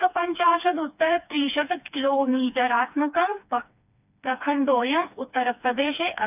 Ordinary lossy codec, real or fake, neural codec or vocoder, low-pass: none; fake; codec, 16 kHz, 2 kbps, FreqCodec, smaller model; 3.6 kHz